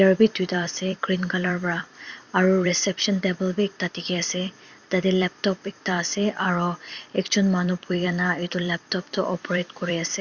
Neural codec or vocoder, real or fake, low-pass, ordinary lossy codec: vocoder, 44.1 kHz, 128 mel bands every 256 samples, BigVGAN v2; fake; 7.2 kHz; Opus, 64 kbps